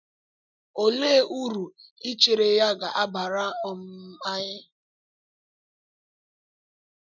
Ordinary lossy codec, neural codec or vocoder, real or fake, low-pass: none; none; real; 7.2 kHz